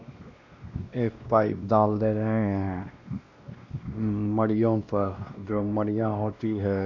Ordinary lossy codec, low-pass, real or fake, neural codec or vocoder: none; 7.2 kHz; fake; codec, 16 kHz, 1 kbps, X-Codec, WavLM features, trained on Multilingual LibriSpeech